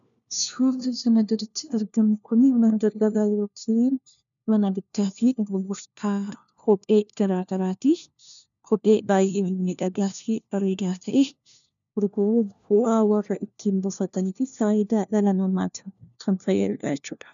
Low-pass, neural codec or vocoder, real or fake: 7.2 kHz; codec, 16 kHz, 1 kbps, FunCodec, trained on LibriTTS, 50 frames a second; fake